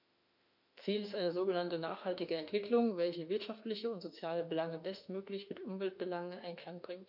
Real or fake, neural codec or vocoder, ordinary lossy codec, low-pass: fake; autoencoder, 48 kHz, 32 numbers a frame, DAC-VAE, trained on Japanese speech; none; 5.4 kHz